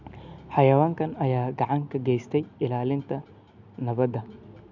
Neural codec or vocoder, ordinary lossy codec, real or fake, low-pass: none; none; real; 7.2 kHz